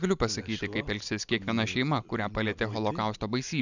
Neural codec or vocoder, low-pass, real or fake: none; 7.2 kHz; real